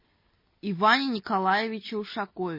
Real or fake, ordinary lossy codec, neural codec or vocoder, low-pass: real; MP3, 24 kbps; none; 5.4 kHz